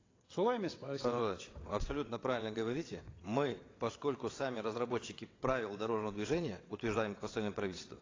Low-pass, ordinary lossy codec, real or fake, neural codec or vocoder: 7.2 kHz; AAC, 32 kbps; fake; vocoder, 22.05 kHz, 80 mel bands, WaveNeXt